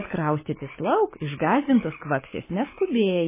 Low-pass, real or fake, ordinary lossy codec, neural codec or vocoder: 3.6 kHz; fake; MP3, 16 kbps; codec, 16 kHz, 6 kbps, DAC